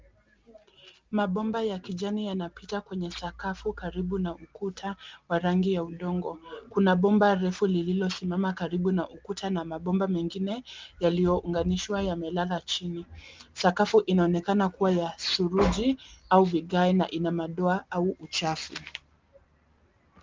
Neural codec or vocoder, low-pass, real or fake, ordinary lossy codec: none; 7.2 kHz; real; Opus, 32 kbps